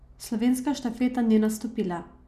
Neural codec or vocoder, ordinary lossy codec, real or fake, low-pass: none; none; real; 14.4 kHz